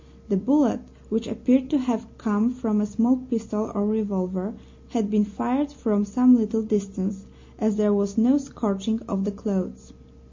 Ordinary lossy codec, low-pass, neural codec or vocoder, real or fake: MP3, 48 kbps; 7.2 kHz; none; real